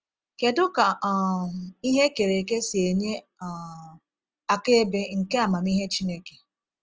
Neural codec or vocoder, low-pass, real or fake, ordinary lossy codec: none; 7.2 kHz; real; Opus, 24 kbps